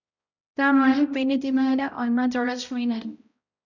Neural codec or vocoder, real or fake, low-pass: codec, 16 kHz, 0.5 kbps, X-Codec, HuBERT features, trained on balanced general audio; fake; 7.2 kHz